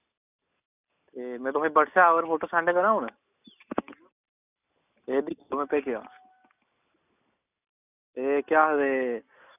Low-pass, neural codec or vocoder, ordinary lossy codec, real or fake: 3.6 kHz; none; none; real